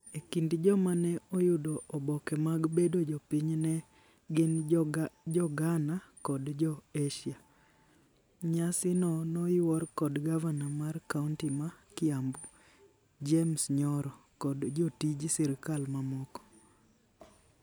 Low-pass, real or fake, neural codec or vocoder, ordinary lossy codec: none; real; none; none